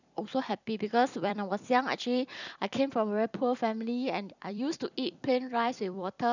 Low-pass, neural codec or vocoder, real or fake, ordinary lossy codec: 7.2 kHz; vocoder, 44.1 kHz, 128 mel bands every 256 samples, BigVGAN v2; fake; none